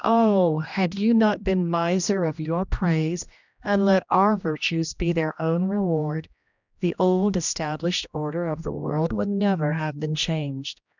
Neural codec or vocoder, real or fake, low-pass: codec, 16 kHz, 1 kbps, X-Codec, HuBERT features, trained on general audio; fake; 7.2 kHz